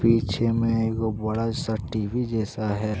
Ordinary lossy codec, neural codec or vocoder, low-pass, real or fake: none; none; none; real